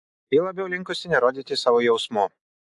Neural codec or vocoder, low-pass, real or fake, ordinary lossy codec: none; 10.8 kHz; real; AAC, 64 kbps